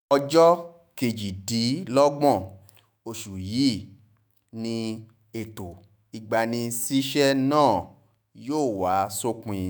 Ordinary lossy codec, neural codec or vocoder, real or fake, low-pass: none; autoencoder, 48 kHz, 128 numbers a frame, DAC-VAE, trained on Japanese speech; fake; none